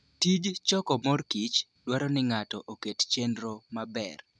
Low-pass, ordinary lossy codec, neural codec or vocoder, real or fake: none; none; none; real